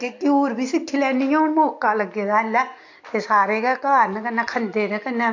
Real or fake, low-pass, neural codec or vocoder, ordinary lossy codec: real; 7.2 kHz; none; AAC, 48 kbps